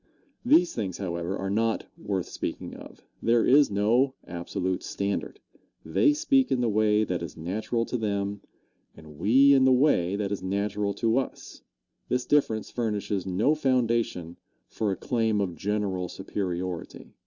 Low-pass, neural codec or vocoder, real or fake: 7.2 kHz; none; real